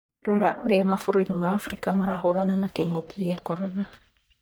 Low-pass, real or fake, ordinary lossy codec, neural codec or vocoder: none; fake; none; codec, 44.1 kHz, 1.7 kbps, Pupu-Codec